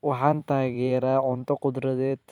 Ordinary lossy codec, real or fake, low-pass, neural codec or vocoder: MP3, 96 kbps; fake; 19.8 kHz; vocoder, 44.1 kHz, 128 mel bands every 256 samples, BigVGAN v2